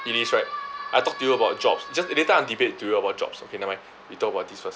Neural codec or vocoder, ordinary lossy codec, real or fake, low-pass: none; none; real; none